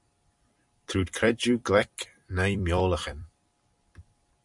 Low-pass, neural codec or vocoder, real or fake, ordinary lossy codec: 10.8 kHz; vocoder, 44.1 kHz, 128 mel bands every 256 samples, BigVGAN v2; fake; MP3, 96 kbps